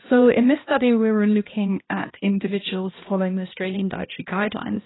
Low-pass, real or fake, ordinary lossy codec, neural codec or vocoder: 7.2 kHz; fake; AAC, 16 kbps; codec, 16 kHz, 1 kbps, X-Codec, HuBERT features, trained on balanced general audio